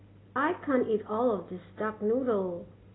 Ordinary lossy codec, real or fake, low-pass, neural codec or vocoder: AAC, 16 kbps; real; 7.2 kHz; none